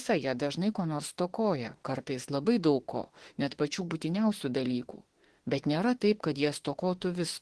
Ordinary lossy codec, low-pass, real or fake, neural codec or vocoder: Opus, 16 kbps; 10.8 kHz; fake; autoencoder, 48 kHz, 32 numbers a frame, DAC-VAE, trained on Japanese speech